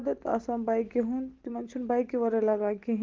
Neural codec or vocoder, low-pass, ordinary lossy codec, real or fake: none; 7.2 kHz; Opus, 16 kbps; real